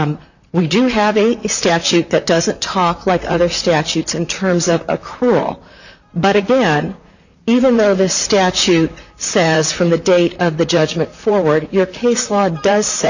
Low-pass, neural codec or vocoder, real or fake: 7.2 kHz; vocoder, 44.1 kHz, 128 mel bands, Pupu-Vocoder; fake